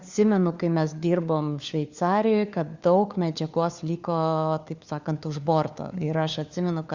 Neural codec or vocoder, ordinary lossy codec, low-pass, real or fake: codec, 16 kHz, 4 kbps, FunCodec, trained on LibriTTS, 50 frames a second; Opus, 64 kbps; 7.2 kHz; fake